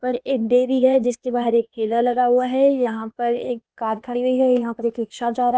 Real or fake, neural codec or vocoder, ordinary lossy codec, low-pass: fake; codec, 16 kHz, 0.8 kbps, ZipCodec; none; none